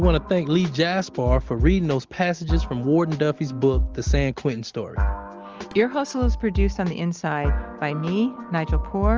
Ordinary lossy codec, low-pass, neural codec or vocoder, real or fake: Opus, 24 kbps; 7.2 kHz; none; real